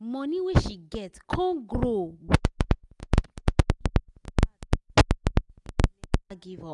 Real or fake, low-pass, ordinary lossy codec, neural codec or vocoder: real; 10.8 kHz; none; none